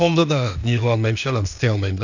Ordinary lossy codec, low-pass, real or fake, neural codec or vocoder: none; 7.2 kHz; fake; codec, 16 kHz, 0.8 kbps, ZipCodec